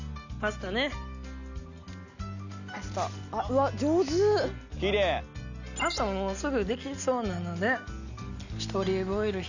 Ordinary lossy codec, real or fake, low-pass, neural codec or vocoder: none; real; 7.2 kHz; none